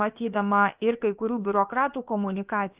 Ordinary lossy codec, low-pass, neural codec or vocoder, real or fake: Opus, 24 kbps; 3.6 kHz; codec, 16 kHz, about 1 kbps, DyCAST, with the encoder's durations; fake